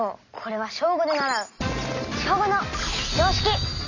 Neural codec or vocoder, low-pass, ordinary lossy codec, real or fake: none; 7.2 kHz; none; real